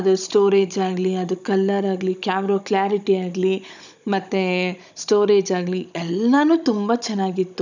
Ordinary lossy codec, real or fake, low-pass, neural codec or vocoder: none; fake; 7.2 kHz; codec, 16 kHz, 16 kbps, FunCodec, trained on Chinese and English, 50 frames a second